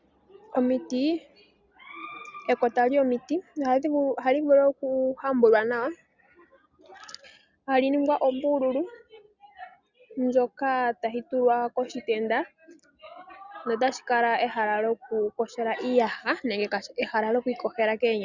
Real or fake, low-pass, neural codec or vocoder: real; 7.2 kHz; none